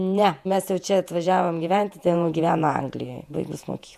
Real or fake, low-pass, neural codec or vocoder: fake; 14.4 kHz; vocoder, 48 kHz, 128 mel bands, Vocos